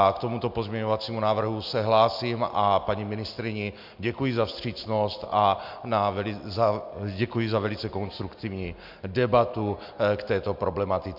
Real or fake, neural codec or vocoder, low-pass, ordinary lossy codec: real; none; 5.4 kHz; MP3, 48 kbps